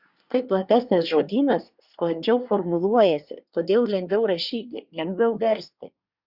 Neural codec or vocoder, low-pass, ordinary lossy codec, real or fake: codec, 24 kHz, 1 kbps, SNAC; 5.4 kHz; Opus, 64 kbps; fake